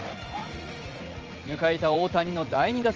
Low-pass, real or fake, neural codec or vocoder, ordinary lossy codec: 7.2 kHz; fake; vocoder, 44.1 kHz, 80 mel bands, Vocos; Opus, 24 kbps